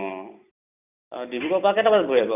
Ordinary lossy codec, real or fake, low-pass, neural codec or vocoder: none; real; 3.6 kHz; none